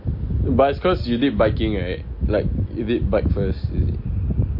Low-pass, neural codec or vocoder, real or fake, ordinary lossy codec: 5.4 kHz; none; real; MP3, 32 kbps